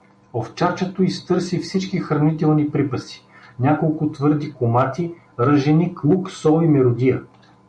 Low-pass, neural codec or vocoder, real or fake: 9.9 kHz; none; real